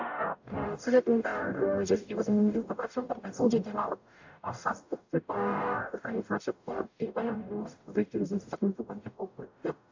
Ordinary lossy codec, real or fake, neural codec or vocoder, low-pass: AAC, 48 kbps; fake; codec, 44.1 kHz, 0.9 kbps, DAC; 7.2 kHz